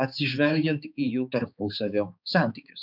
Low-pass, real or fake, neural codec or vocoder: 5.4 kHz; fake; codec, 16 kHz, 4 kbps, X-Codec, HuBERT features, trained on balanced general audio